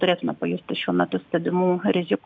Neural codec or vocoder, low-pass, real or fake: none; 7.2 kHz; real